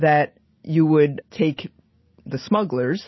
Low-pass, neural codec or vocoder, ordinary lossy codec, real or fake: 7.2 kHz; none; MP3, 24 kbps; real